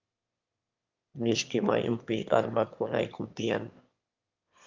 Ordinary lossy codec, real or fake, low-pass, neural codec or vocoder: Opus, 24 kbps; fake; 7.2 kHz; autoencoder, 22.05 kHz, a latent of 192 numbers a frame, VITS, trained on one speaker